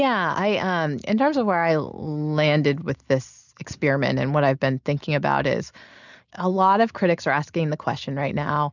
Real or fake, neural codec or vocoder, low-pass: real; none; 7.2 kHz